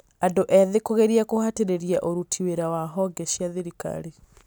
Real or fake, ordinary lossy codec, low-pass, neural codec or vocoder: real; none; none; none